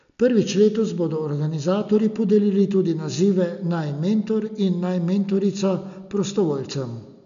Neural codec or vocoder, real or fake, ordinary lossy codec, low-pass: none; real; none; 7.2 kHz